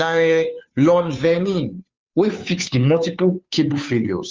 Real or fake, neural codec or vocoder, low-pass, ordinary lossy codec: fake; codec, 16 kHz, 2 kbps, FunCodec, trained on Chinese and English, 25 frames a second; 7.2 kHz; Opus, 32 kbps